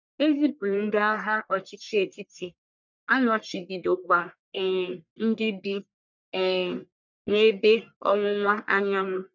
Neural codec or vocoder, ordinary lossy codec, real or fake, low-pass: codec, 44.1 kHz, 1.7 kbps, Pupu-Codec; none; fake; 7.2 kHz